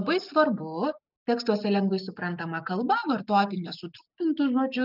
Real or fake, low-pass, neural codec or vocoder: real; 5.4 kHz; none